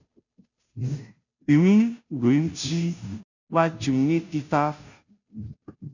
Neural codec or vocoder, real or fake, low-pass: codec, 16 kHz, 0.5 kbps, FunCodec, trained on Chinese and English, 25 frames a second; fake; 7.2 kHz